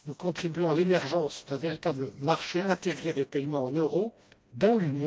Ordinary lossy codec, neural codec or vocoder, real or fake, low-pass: none; codec, 16 kHz, 1 kbps, FreqCodec, smaller model; fake; none